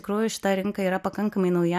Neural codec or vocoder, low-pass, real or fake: none; 14.4 kHz; real